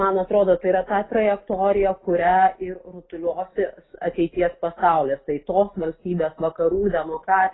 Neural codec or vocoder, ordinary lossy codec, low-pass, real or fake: none; AAC, 16 kbps; 7.2 kHz; real